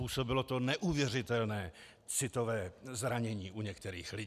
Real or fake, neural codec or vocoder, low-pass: real; none; 14.4 kHz